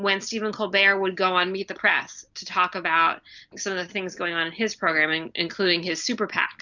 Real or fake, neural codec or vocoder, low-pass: real; none; 7.2 kHz